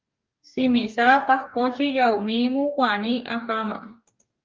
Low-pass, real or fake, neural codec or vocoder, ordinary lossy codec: 7.2 kHz; fake; codec, 44.1 kHz, 2.6 kbps, DAC; Opus, 24 kbps